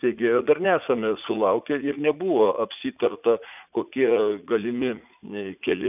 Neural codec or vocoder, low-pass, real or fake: vocoder, 44.1 kHz, 80 mel bands, Vocos; 3.6 kHz; fake